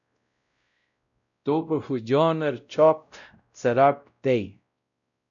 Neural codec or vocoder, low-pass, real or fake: codec, 16 kHz, 0.5 kbps, X-Codec, WavLM features, trained on Multilingual LibriSpeech; 7.2 kHz; fake